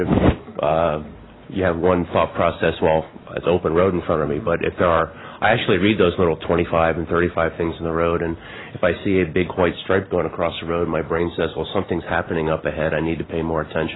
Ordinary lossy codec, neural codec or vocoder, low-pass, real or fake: AAC, 16 kbps; none; 7.2 kHz; real